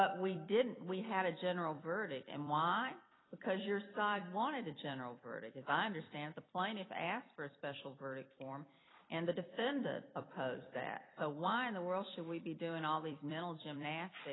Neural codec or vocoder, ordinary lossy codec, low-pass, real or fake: vocoder, 44.1 kHz, 128 mel bands every 256 samples, BigVGAN v2; AAC, 16 kbps; 7.2 kHz; fake